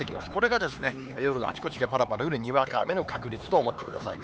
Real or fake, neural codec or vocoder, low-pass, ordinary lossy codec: fake; codec, 16 kHz, 4 kbps, X-Codec, HuBERT features, trained on LibriSpeech; none; none